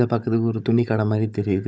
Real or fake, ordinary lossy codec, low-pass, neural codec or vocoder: fake; none; none; codec, 16 kHz, 16 kbps, FunCodec, trained on Chinese and English, 50 frames a second